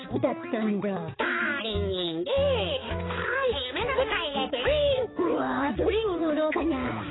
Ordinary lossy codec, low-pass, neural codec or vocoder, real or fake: AAC, 16 kbps; 7.2 kHz; codec, 16 kHz, 4 kbps, X-Codec, HuBERT features, trained on balanced general audio; fake